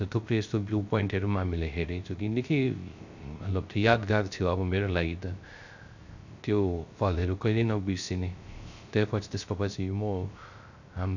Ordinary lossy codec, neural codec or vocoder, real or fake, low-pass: none; codec, 16 kHz, 0.3 kbps, FocalCodec; fake; 7.2 kHz